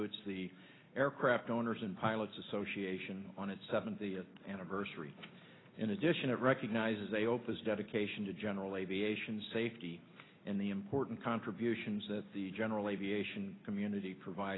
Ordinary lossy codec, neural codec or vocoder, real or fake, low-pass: AAC, 16 kbps; none; real; 7.2 kHz